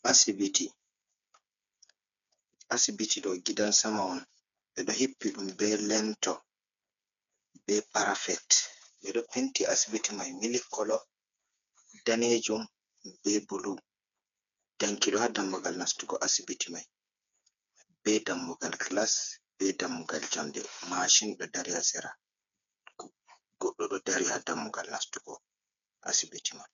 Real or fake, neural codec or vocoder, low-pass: fake; codec, 16 kHz, 4 kbps, FreqCodec, smaller model; 7.2 kHz